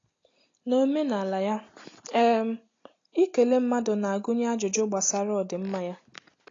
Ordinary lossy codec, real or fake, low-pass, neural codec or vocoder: AAC, 32 kbps; real; 7.2 kHz; none